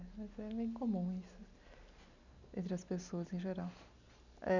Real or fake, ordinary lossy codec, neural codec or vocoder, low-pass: real; none; none; 7.2 kHz